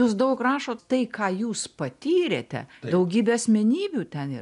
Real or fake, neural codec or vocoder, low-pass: real; none; 10.8 kHz